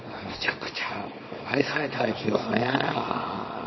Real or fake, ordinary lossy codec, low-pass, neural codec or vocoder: fake; MP3, 24 kbps; 7.2 kHz; codec, 24 kHz, 0.9 kbps, WavTokenizer, small release